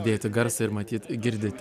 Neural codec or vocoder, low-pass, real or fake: none; 14.4 kHz; real